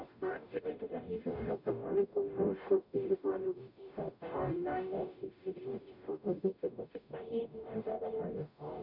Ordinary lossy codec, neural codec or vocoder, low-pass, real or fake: none; codec, 44.1 kHz, 0.9 kbps, DAC; 5.4 kHz; fake